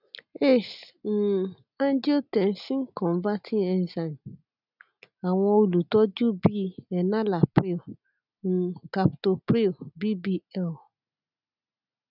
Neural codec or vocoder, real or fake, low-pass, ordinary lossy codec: none; real; 5.4 kHz; none